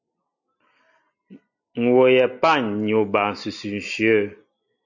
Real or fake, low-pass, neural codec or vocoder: real; 7.2 kHz; none